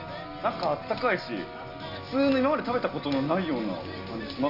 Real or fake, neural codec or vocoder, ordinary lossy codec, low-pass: real; none; Opus, 64 kbps; 5.4 kHz